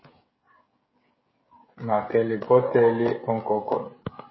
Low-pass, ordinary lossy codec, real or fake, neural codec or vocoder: 7.2 kHz; MP3, 24 kbps; fake; codec, 16 kHz, 16 kbps, FreqCodec, smaller model